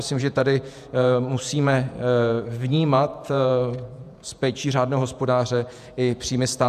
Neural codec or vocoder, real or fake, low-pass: vocoder, 48 kHz, 128 mel bands, Vocos; fake; 14.4 kHz